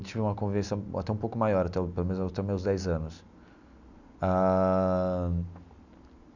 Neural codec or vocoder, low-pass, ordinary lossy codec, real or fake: none; 7.2 kHz; none; real